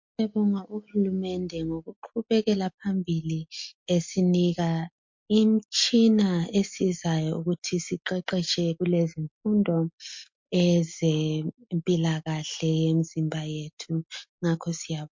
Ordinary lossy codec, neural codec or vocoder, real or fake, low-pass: MP3, 48 kbps; none; real; 7.2 kHz